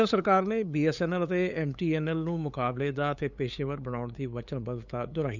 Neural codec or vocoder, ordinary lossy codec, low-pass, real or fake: codec, 16 kHz, 8 kbps, FunCodec, trained on LibriTTS, 25 frames a second; none; 7.2 kHz; fake